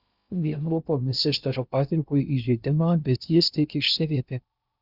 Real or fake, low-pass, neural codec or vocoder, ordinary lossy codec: fake; 5.4 kHz; codec, 16 kHz in and 24 kHz out, 0.6 kbps, FocalCodec, streaming, 2048 codes; Opus, 64 kbps